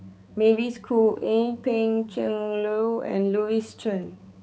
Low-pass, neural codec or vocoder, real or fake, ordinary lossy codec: none; codec, 16 kHz, 4 kbps, X-Codec, HuBERT features, trained on balanced general audio; fake; none